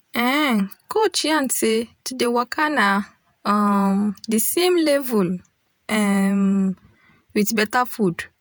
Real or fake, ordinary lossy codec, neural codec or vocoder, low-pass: fake; none; vocoder, 48 kHz, 128 mel bands, Vocos; none